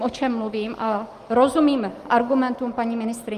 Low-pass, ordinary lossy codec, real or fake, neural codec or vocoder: 14.4 kHz; Opus, 24 kbps; real; none